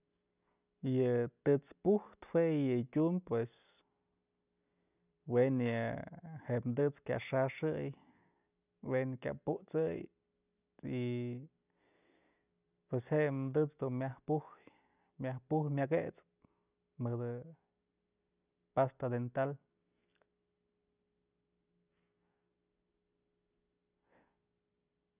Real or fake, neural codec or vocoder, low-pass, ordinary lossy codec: real; none; 3.6 kHz; none